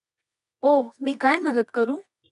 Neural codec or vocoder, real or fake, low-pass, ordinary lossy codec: codec, 24 kHz, 0.9 kbps, WavTokenizer, medium music audio release; fake; 10.8 kHz; none